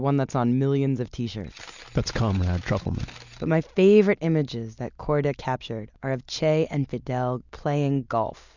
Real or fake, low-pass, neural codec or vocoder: real; 7.2 kHz; none